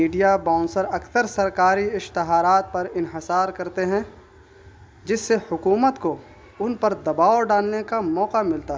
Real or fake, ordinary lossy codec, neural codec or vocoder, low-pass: real; none; none; none